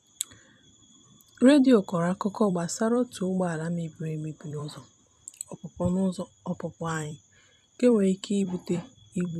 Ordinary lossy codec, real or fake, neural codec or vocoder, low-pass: none; fake; vocoder, 44.1 kHz, 128 mel bands every 256 samples, BigVGAN v2; 14.4 kHz